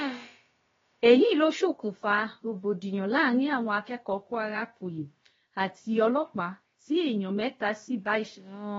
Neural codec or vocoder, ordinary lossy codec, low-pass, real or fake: codec, 16 kHz, about 1 kbps, DyCAST, with the encoder's durations; AAC, 24 kbps; 7.2 kHz; fake